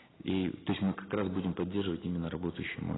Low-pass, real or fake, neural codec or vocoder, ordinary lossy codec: 7.2 kHz; real; none; AAC, 16 kbps